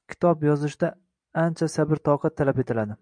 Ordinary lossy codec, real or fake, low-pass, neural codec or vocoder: MP3, 96 kbps; fake; 9.9 kHz; vocoder, 44.1 kHz, 128 mel bands every 256 samples, BigVGAN v2